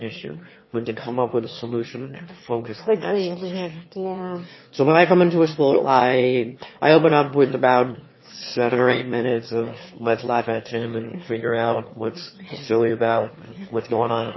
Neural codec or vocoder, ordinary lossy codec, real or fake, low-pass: autoencoder, 22.05 kHz, a latent of 192 numbers a frame, VITS, trained on one speaker; MP3, 24 kbps; fake; 7.2 kHz